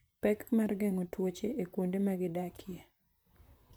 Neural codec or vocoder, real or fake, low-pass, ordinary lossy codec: vocoder, 44.1 kHz, 128 mel bands, Pupu-Vocoder; fake; none; none